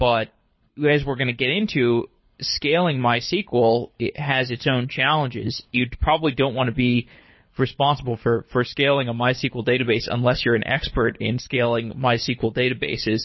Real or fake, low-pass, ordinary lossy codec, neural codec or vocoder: fake; 7.2 kHz; MP3, 24 kbps; codec, 24 kHz, 6 kbps, HILCodec